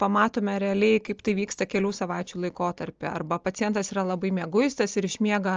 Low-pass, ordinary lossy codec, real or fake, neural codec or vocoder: 7.2 kHz; Opus, 24 kbps; real; none